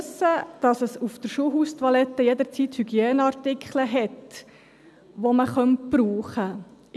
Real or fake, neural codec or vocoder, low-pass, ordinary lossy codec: real; none; none; none